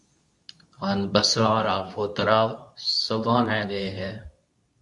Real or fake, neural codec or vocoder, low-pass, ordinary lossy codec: fake; codec, 24 kHz, 0.9 kbps, WavTokenizer, medium speech release version 2; 10.8 kHz; AAC, 64 kbps